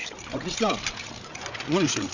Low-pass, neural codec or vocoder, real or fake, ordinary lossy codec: 7.2 kHz; codec, 16 kHz, 8 kbps, FreqCodec, larger model; fake; none